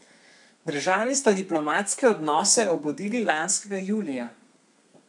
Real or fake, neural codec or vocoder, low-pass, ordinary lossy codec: fake; codec, 32 kHz, 1.9 kbps, SNAC; 10.8 kHz; none